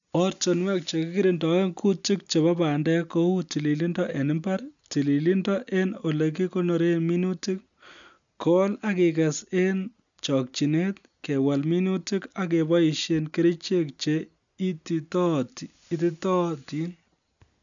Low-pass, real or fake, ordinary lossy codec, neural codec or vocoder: 7.2 kHz; real; none; none